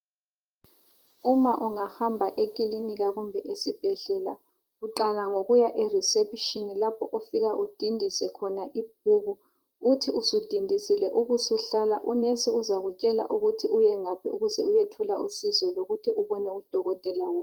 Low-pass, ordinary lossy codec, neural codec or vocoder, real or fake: 19.8 kHz; Opus, 32 kbps; vocoder, 44.1 kHz, 128 mel bands, Pupu-Vocoder; fake